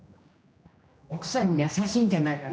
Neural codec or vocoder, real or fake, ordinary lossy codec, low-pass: codec, 16 kHz, 1 kbps, X-Codec, HuBERT features, trained on general audio; fake; none; none